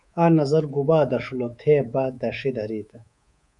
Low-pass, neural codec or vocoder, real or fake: 10.8 kHz; codec, 24 kHz, 3.1 kbps, DualCodec; fake